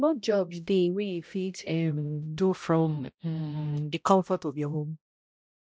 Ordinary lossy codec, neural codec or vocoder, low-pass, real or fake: none; codec, 16 kHz, 0.5 kbps, X-Codec, HuBERT features, trained on balanced general audio; none; fake